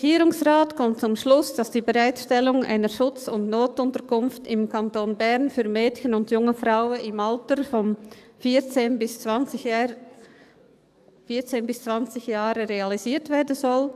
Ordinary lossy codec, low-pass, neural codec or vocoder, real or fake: none; 14.4 kHz; codec, 44.1 kHz, 7.8 kbps, DAC; fake